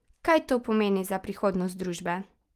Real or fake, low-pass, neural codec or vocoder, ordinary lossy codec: real; 14.4 kHz; none; Opus, 24 kbps